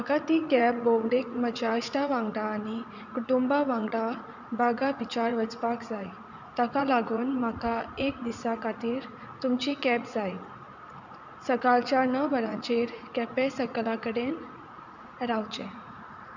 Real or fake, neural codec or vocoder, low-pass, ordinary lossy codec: fake; vocoder, 44.1 kHz, 80 mel bands, Vocos; 7.2 kHz; none